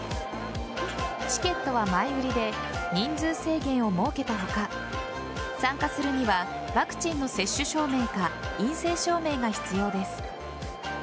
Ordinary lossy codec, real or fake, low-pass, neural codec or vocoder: none; real; none; none